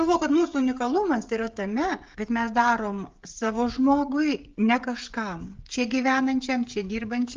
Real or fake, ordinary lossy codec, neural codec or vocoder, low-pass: fake; Opus, 32 kbps; codec, 16 kHz, 16 kbps, FreqCodec, smaller model; 7.2 kHz